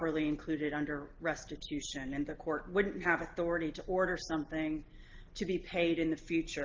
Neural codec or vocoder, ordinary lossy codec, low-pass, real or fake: none; Opus, 16 kbps; 7.2 kHz; real